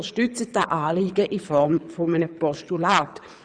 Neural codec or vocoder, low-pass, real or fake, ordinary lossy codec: codec, 24 kHz, 6 kbps, HILCodec; 9.9 kHz; fake; none